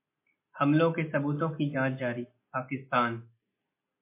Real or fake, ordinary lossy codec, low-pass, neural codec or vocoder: real; MP3, 24 kbps; 3.6 kHz; none